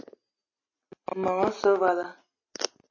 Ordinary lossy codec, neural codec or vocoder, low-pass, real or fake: MP3, 32 kbps; none; 7.2 kHz; real